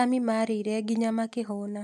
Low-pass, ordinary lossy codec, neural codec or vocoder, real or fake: 14.4 kHz; none; none; real